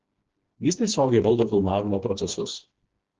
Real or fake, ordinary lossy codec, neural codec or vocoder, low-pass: fake; Opus, 16 kbps; codec, 16 kHz, 2 kbps, FreqCodec, smaller model; 7.2 kHz